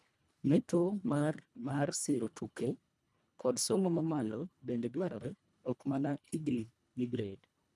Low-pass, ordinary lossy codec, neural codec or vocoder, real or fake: none; none; codec, 24 kHz, 1.5 kbps, HILCodec; fake